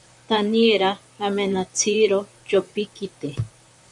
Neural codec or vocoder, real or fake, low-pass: vocoder, 44.1 kHz, 128 mel bands, Pupu-Vocoder; fake; 10.8 kHz